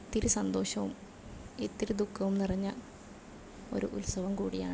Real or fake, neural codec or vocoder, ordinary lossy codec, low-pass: real; none; none; none